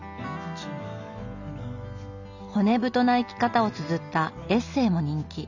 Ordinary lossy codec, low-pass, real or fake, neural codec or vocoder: none; 7.2 kHz; real; none